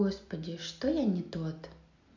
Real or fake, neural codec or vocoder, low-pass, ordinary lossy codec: real; none; 7.2 kHz; none